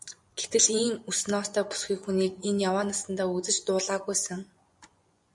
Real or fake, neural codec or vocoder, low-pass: fake; vocoder, 24 kHz, 100 mel bands, Vocos; 10.8 kHz